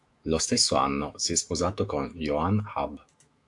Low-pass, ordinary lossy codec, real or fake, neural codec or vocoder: 10.8 kHz; AAC, 64 kbps; fake; autoencoder, 48 kHz, 128 numbers a frame, DAC-VAE, trained on Japanese speech